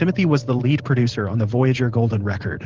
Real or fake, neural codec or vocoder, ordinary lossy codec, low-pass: fake; vocoder, 44.1 kHz, 128 mel bands every 512 samples, BigVGAN v2; Opus, 16 kbps; 7.2 kHz